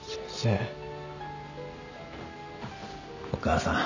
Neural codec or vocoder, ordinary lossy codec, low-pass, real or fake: none; none; 7.2 kHz; real